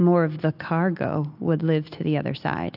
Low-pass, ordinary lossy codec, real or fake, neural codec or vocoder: 5.4 kHz; AAC, 48 kbps; real; none